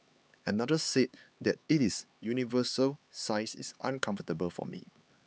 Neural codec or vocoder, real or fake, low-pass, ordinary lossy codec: codec, 16 kHz, 4 kbps, X-Codec, HuBERT features, trained on LibriSpeech; fake; none; none